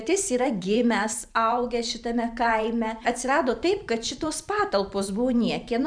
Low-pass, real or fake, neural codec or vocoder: 9.9 kHz; fake; vocoder, 44.1 kHz, 128 mel bands every 512 samples, BigVGAN v2